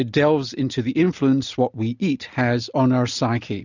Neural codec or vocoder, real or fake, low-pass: none; real; 7.2 kHz